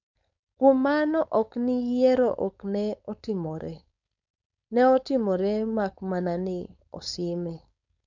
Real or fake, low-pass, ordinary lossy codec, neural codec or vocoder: fake; 7.2 kHz; none; codec, 16 kHz, 4.8 kbps, FACodec